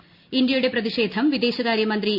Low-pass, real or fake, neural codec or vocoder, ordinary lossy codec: 5.4 kHz; real; none; Opus, 64 kbps